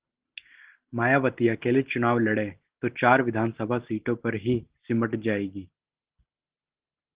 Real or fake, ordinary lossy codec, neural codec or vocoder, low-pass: real; Opus, 16 kbps; none; 3.6 kHz